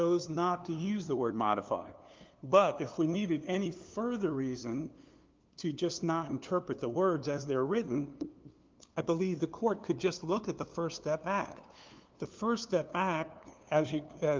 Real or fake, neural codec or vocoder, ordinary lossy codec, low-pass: fake; codec, 16 kHz, 2 kbps, FunCodec, trained on LibriTTS, 25 frames a second; Opus, 32 kbps; 7.2 kHz